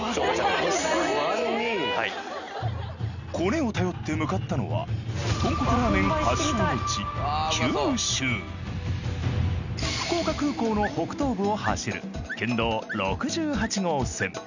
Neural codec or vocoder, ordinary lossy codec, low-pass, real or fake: none; none; 7.2 kHz; real